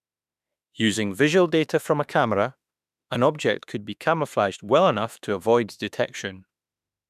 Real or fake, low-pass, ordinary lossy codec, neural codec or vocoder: fake; 14.4 kHz; none; autoencoder, 48 kHz, 32 numbers a frame, DAC-VAE, trained on Japanese speech